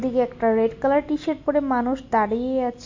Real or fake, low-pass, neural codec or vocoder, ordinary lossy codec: real; 7.2 kHz; none; MP3, 48 kbps